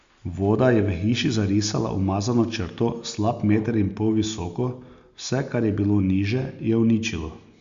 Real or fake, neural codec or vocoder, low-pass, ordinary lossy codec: real; none; 7.2 kHz; Opus, 64 kbps